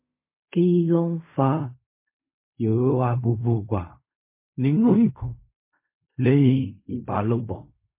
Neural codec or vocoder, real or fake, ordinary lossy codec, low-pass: codec, 16 kHz in and 24 kHz out, 0.4 kbps, LongCat-Audio-Codec, fine tuned four codebook decoder; fake; MP3, 24 kbps; 3.6 kHz